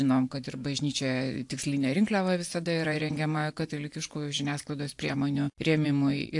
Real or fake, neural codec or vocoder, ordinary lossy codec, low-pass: fake; vocoder, 24 kHz, 100 mel bands, Vocos; AAC, 64 kbps; 10.8 kHz